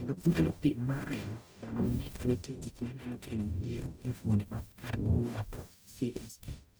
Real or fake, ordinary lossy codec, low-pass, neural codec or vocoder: fake; none; none; codec, 44.1 kHz, 0.9 kbps, DAC